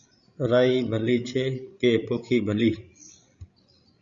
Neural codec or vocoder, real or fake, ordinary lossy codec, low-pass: codec, 16 kHz, 16 kbps, FreqCodec, larger model; fake; Opus, 64 kbps; 7.2 kHz